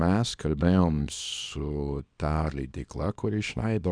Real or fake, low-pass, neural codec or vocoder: fake; 9.9 kHz; codec, 24 kHz, 0.9 kbps, WavTokenizer, small release